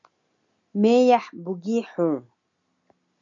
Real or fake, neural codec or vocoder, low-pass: real; none; 7.2 kHz